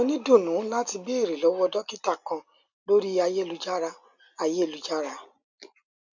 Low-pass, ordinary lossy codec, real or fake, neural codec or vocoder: 7.2 kHz; none; real; none